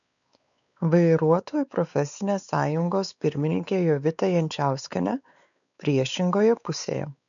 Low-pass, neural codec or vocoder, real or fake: 7.2 kHz; codec, 16 kHz, 4 kbps, X-Codec, WavLM features, trained on Multilingual LibriSpeech; fake